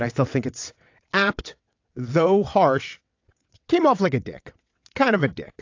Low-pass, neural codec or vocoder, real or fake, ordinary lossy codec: 7.2 kHz; vocoder, 22.05 kHz, 80 mel bands, WaveNeXt; fake; AAC, 48 kbps